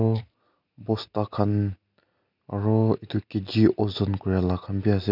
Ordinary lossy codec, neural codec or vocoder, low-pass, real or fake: none; none; 5.4 kHz; real